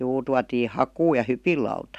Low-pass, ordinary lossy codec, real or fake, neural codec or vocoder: 14.4 kHz; none; real; none